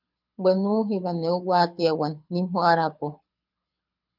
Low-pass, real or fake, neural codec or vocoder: 5.4 kHz; fake; codec, 24 kHz, 6 kbps, HILCodec